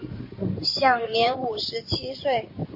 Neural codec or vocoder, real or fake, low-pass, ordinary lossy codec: codec, 16 kHz in and 24 kHz out, 2.2 kbps, FireRedTTS-2 codec; fake; 5.4 kHz; MP3, 32 kbps